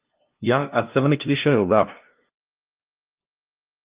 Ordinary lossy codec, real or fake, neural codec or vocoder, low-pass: Opus, 32 kbps; fake; codec, 16 kHz, 0.5 kbps, FunCodec, trained on LibriTTS, 25 frames a second; 3.6 kHz